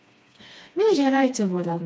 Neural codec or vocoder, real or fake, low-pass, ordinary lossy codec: codec, 16 kHz, 2 kbps, FreqCodec, smaller model; fake; none; none